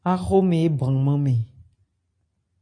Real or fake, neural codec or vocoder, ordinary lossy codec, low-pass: real; none; MP3, 48 kbps; 9.9 kHz